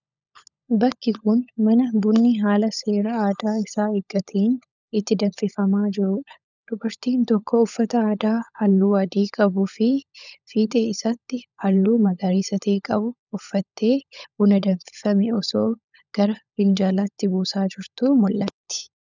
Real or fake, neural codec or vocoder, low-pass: fake; codec, 16 kHz, 16 kbps, FunCodec, trained on LibriTTS, 50 frames a second; 7.2 kHz